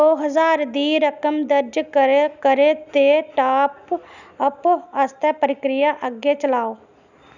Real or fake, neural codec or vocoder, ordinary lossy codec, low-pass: real; none; none; 7.2 kHz